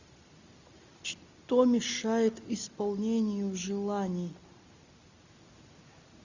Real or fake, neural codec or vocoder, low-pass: real; none; 7.2 kHz